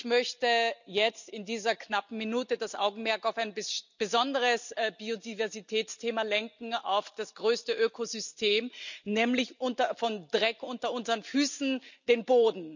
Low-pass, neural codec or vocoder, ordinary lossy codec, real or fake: 7.2 kHz; none; none; real